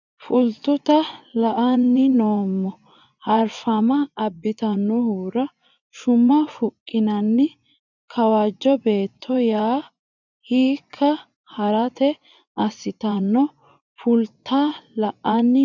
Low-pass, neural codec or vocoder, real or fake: 7.2 kHz; vocoder, 24 kHz, 100 mel bands, Vocos; fake